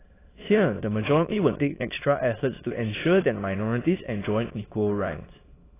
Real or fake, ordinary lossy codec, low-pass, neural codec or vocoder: fake; AAC, 16 kbps; 3.6 kHz; autoencoder, 22.05 kHz, a latent of 192 numbers a frame, VITS, trained on many speakers